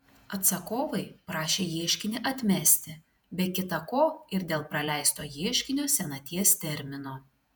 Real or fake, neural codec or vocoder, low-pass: fake; vocoder, 48 kHz, 128 mel bands, Vocos; 19.8 kHz